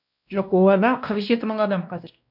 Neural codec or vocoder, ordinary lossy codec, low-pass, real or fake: codec, 16 kHz, 1 kbps, X-Codec, WavLM features, trained on Multilingual LibriSpeech; none; 5.4 kHz; fake